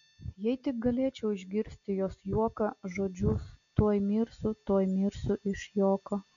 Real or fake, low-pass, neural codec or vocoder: real; 7.2 kHz; none